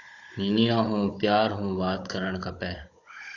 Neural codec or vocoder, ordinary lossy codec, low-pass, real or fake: codec, 16 kHz, 16 kbps, FunCodec, trained on Chinese and English, 50 frames a second; AAC, 48 kbps; 7.2 kHz; fake